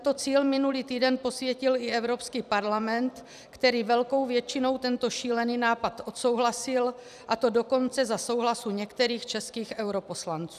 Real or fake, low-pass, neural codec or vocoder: fake; 14.4 kHz; vocoder, 44.1 kHz, 128 mel bands every 256 samples, BigVGAN v2